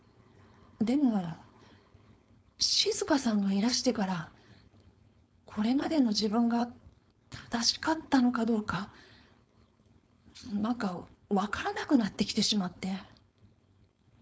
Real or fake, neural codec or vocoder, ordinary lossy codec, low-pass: fake; codec, 16 kHz, 4.8 kbps, FACodec; none; none